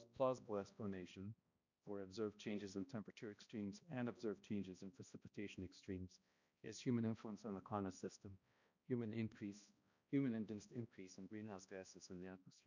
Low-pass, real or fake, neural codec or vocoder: 7.2 kHz; fake; codec, 16 kHz, 1 kbps, X-Codec, HuBERT features, trained on balanced general audio